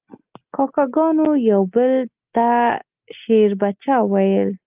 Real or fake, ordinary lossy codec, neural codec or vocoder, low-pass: real; Opus, 32 kbps; none; 3.6 kHz